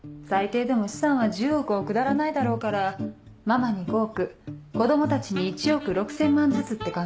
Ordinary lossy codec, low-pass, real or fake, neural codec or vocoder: none; none; real; none